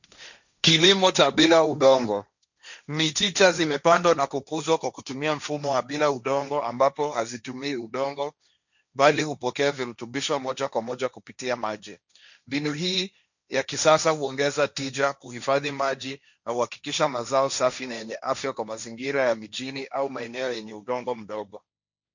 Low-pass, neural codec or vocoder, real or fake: 7.2 kHz; codec, 16 kHz, 1.1 kbps, Voila-Tokenizer; fake